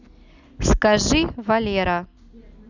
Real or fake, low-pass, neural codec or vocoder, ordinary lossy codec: real; 7.2 kHz; none; none